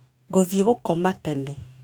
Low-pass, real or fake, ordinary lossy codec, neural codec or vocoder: 19.8 kHz; fake; none; codec, 44.1 kHz, 2.6 kbps, DAC